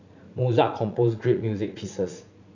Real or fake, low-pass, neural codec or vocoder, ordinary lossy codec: fake; 7.2 kHz; vocoder, 44.1 kHz, 80 mel bands, Vocos; none